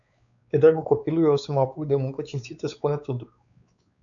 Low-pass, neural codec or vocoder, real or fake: 7.2 kHz; codec, 16 kHz, 4 kbps, X-Codec, WavLM features, trained on Multilingual LibriSpeech; fake